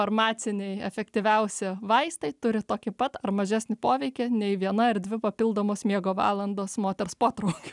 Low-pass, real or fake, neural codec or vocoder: 10.8 kHz; real; none